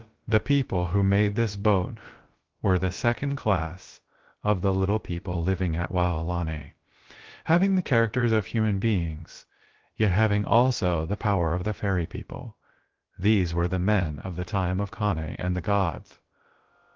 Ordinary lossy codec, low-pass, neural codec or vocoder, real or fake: Opus, 16 kbps; 7.2 kHz; codec, 16 kHz, about 1 kbps, DyCAST, with the encoder's durations; fake